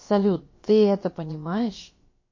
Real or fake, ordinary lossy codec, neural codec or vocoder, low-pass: fake; MP3, 32 kbps; codec, 16 kHz, about 1 kbps, DyCAST, with the encoder's durations; 7.2 kHz